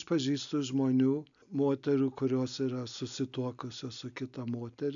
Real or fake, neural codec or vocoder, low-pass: real; none; 7.2 kHz